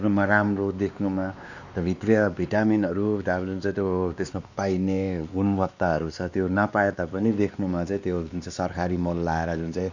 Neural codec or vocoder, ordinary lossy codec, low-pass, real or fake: codec, 16 kHz, 2 kbps, X-Codec, WavLM features, trained on Multilingual LibriSpeech; none; 7.2 kHz; fake